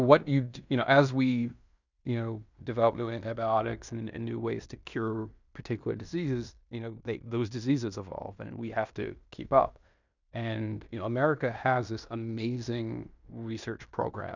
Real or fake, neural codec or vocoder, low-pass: fake; codec, 16 kHz in and 24 kHz out, 0.9 kbps, LongCat-Audio-Codec, fine tuned four codebook decoder; 7.2 kHz